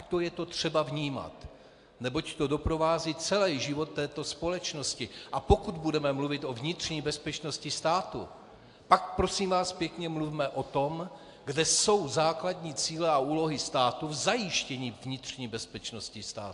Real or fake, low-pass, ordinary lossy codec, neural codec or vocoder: real; 10.8 kHz; AAC, 64 kbps; none